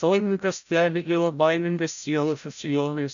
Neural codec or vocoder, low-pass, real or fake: codec, 16 kHz, 0.5 kbps, FreqCodec, larger model; 7.2 kHz; fake